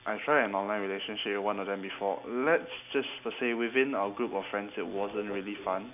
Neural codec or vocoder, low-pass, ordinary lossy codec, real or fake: none; 3.6 kHz; none; real